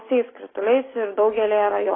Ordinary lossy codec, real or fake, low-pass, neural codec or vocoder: AAC, 16 kbps; real; 7.2 kHz; none